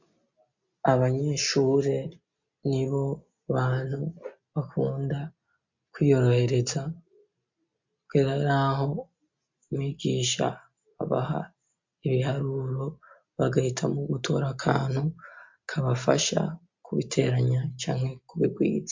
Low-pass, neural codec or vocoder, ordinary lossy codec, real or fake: 7.2 kHz; none; MP3, 48 kbps; real